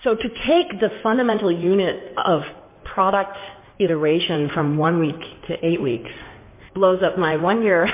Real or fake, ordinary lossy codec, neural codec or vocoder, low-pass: fake; MP3, 24 kbps; codec, 16 kHz in and 24 kHz out, 2.2 kbps, FireRedTTS-2 codec; 3.6 kHz